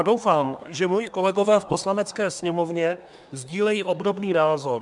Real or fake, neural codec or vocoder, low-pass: fake; codec, 24 kHz, 1 kbps, SNAC; 10.8 kHz